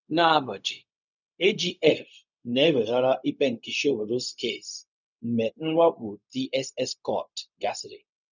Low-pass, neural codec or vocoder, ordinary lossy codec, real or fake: 7.2 kHz; codec, 16 kHz, 0.4 kbps, LongCat-Audio-Codec; none; fake